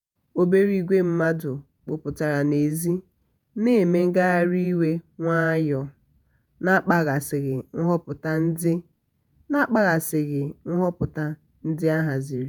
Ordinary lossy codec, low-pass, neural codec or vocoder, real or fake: none; none; vocoder, 48 kHz, 128 mel bands, Vocos; fake